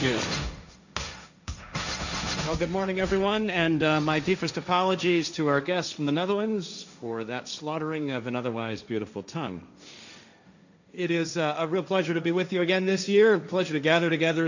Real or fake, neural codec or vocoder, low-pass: fake; codec, 16 kHz, 1.1 kbps, Voila-Tokenizer; 7.2 kHz